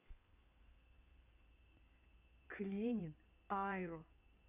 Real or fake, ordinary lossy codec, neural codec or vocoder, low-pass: fake; none; codec, 16 kHz in and 24 kHz out, 2.2 kbps, FireRedTTS-2 codec; 3.6 kHz